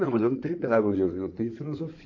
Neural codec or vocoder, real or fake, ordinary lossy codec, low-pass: codec, 16 kHz in and 24 kHz out, 2.2 kbps, FireRedTTS-2 codec; fake; AAC, 48 kbps; 7.2 kHz